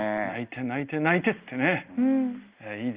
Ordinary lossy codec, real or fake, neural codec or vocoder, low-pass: Opus, 32 kbps; real; none; 3.6 kHz